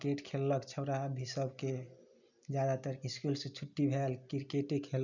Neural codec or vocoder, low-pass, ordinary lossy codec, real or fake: none; 7.2 kHz; none; real